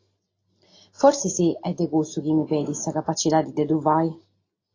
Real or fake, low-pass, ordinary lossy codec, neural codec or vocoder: real; 7.2 kHz; AAC, 32 kbps; none